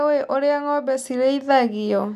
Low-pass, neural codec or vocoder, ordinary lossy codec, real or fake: 14.4 kHz; none; none; real